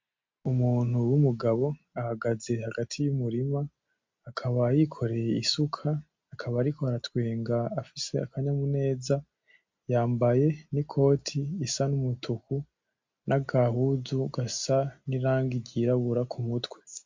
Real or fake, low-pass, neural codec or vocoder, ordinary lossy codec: real; 7.2 kHz; none; MP3, 48 kbps